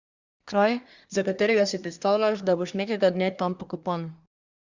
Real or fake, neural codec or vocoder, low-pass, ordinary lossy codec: fake; codec, 24 kHz, 1 kbps, SNAC; 7.2 kHz; Opus, 64 kbps